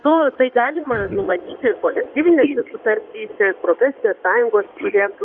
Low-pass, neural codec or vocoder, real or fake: 9.9 kHz; codec, 16 kHz in and 24 kHz out, 2.2 kbps, FireRedTTS-2 codec; fake